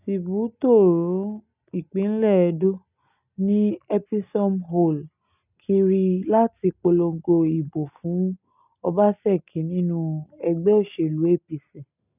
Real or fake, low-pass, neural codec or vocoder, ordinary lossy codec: real; 3.6 kHz; none; none